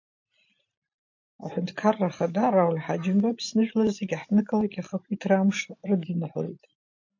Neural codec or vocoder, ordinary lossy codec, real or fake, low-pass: none; MP3, 48 kbps; real; 7.2 kHz